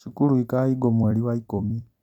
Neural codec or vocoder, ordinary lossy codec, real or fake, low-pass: vocoder, 44.1 kHz, 128 mel bands every 256 samples, BigVGAN v2; none; fake; 19.8 kHz